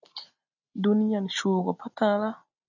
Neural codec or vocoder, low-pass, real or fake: none; 7.2 kHz; real